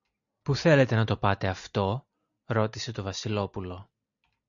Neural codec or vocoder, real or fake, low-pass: none; real; 7.2 kHz